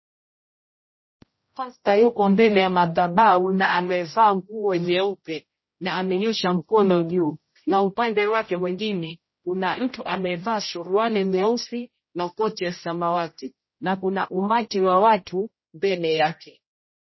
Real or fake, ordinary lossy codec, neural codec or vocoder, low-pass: fake; MP3, 24 kbps; codec, 16 kHz, 0.5 kbps, X-Codec, HuBERT features, trained on general audio; 7.2 kHz